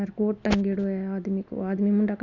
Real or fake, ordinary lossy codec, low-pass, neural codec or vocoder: real; none; 7.2 kHz; none